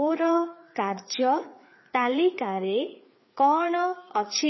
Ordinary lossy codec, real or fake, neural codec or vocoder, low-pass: MP3, 24 kbps; fake; codec, 16 kHz, 4 kbps, FreqCodec, larger model; 7.2 kHz